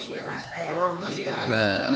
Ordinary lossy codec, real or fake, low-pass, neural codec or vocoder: none; fake; none; codec, 16 kHz, 4 kbps, X-Codec, HuBERT features, trained on LibriSpeech